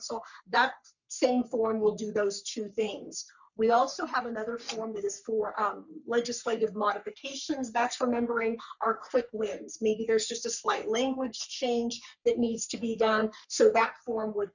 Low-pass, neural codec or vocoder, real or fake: 7.2 kHz; codec, 44.1 kHz, 3.4 kbps, Pupu-Codec; fake